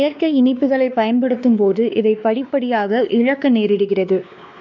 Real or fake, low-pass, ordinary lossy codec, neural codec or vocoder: fake; 7.2 kHz; none; codec, 16 kHz, 2 kbps, X-Codec, WavLM features, trained on Multilingual LibriSpeech